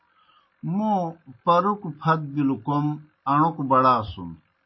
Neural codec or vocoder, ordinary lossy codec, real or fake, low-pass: none; MP3, 24 kbps; real; 7.2 kHz